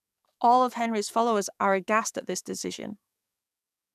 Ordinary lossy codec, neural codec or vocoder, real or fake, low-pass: none; codec, 44.1 kHz, 7.8 kbps, DAC; fake; 14.4 kHz